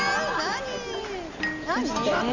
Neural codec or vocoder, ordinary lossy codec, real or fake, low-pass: none; Opus, 64 kbps; real; 7.2 kHz